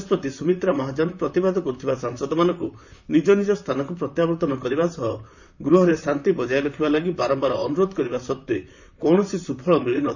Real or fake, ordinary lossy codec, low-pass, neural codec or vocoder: fake; none; 7.2 kHz; vocoder, 44.1 kHz, 128 mel bands, Pupu-Vocoder